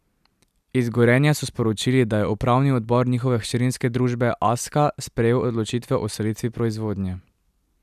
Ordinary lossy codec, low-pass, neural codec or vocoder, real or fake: none; 14.4 kHz; none; real